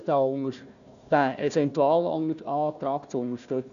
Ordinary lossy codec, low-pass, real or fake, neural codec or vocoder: none; 7.2 kHz; fake; codec, 16 kHz, 1 kbps, FunCodec, trained on Chinese and English, 50 frames a second